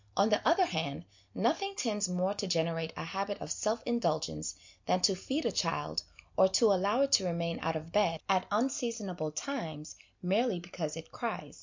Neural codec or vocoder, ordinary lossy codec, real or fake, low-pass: none; MP3, 64 kbps; real; 7.2 kHz